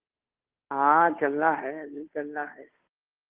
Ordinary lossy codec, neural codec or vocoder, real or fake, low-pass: Opus, 16 kbps; codec, 16 kHz, 2 kbps, FunCodec, trained on Chinese and English, 25 frames a second; fake; 3.6 kHz